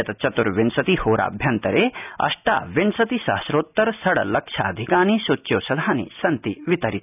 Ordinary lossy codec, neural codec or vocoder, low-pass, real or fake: none; none; 3.6 kHz; real